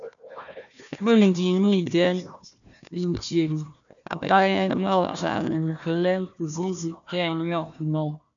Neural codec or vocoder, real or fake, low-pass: codec, 16 kHz, 1 kbps, FunCodec, trained on Chinese and English, 50 frames a second; fake; 7.2 kHz